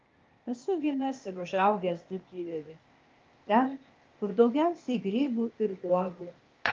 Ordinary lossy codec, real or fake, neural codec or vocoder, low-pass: Opus, 24 kbps; fake; codec, 16 kHz, 0.8 kbps, ZipCodec; 7.2 kHz